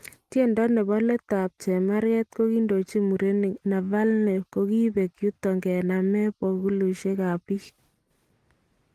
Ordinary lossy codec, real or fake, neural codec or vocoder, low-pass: Opus, 24 kbps; real; none; 14.4 kHz